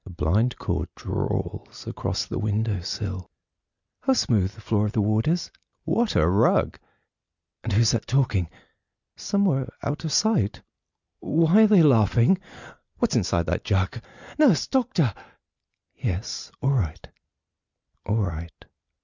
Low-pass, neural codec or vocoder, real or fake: 7.2 kHz; none; real